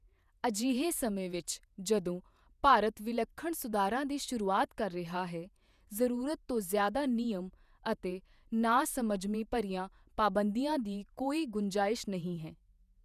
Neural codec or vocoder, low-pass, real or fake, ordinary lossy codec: vocoder, 48 kHz, 128 mel bands, Vocos; 14.4 kHz; fake; none